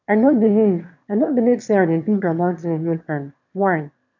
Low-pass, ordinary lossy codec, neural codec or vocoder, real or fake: 7.2 kHz; MP3, 64 kbps; autoencoder, 22.05 kHz, a latent of 192 numbers a frame, VITS, trained on one speaker; fake